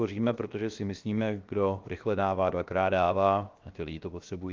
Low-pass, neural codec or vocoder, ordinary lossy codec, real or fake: 7.2 kHz; codec, 16 kHz, 0.7 kbps, FocalCodec; Opus, 24 kbps; fake